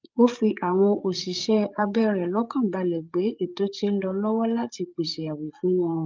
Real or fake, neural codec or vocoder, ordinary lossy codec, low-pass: fake; codec, 16 kHz, 8 kbps, FreqCodec, larger model; Opus, 24 kbps; 7.2 kHz